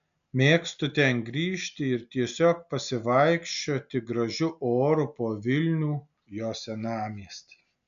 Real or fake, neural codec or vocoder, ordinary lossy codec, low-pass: real; none; MP3, 96 kbps; 7.2 kHz